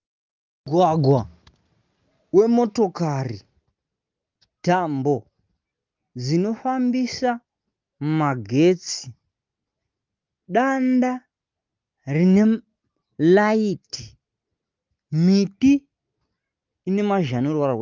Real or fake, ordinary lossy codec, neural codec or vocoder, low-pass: real; Opus, 32 kbps; none; 7.2 kHz